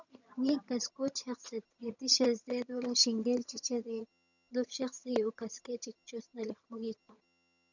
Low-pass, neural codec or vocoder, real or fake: 7.2 kHz; vocoder, 22.05 kHz, 80 mel bands, HiFi-GAN; fake